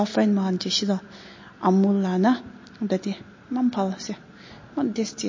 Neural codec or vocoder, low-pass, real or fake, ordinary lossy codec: none; 7.2 kHz; real; MP3, 32 kbps